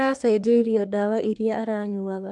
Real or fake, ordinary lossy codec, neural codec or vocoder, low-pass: fake; none; codec, 24 kHz, 1 kbps, SNAC; 10.8 kHz